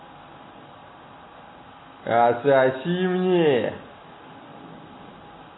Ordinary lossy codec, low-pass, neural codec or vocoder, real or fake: AAC, 16 kbps; 7.2 kHz; none; real